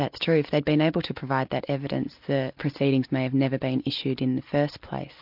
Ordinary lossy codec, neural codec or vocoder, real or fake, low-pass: MP3, 48 kbps; none; real; 5.4 kHz